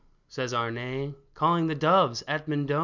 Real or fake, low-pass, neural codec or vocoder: real; 7.2 kHz; none